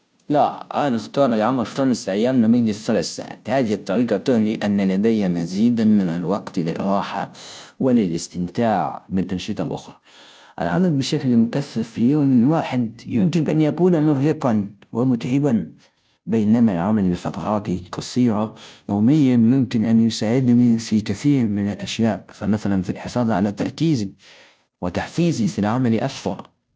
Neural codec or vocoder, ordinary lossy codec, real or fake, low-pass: codec, 16 kHz, 0.5 kbps, FunCodec, trained on Chinese and English, 25 frames a second; none; fake; none